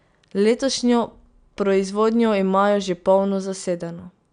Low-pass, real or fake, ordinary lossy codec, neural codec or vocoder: 9.9 kHz; real; none; none